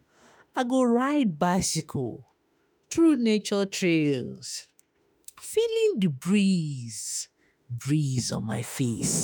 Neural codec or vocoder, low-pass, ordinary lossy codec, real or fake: autoencoder, 48 kHz, 32 numbers a frame, DAC-VAE, trained on Japanese speech; none; none; fake